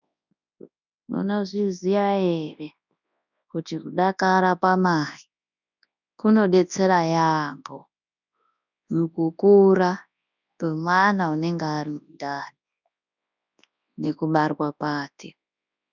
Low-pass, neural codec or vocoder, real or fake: 7.2 kHz; codec, 24 kHz, 0.9 kbps, WavTokenizer, large speech release; fake